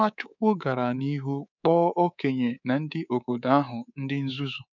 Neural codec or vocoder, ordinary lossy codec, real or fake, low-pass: codec, 24 kHz, 3.1 kbps, DualCodec; none; fake; 7.2 kHz